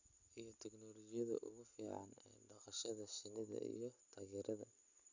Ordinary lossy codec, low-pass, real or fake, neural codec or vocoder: none; 7.2 kHz; real; none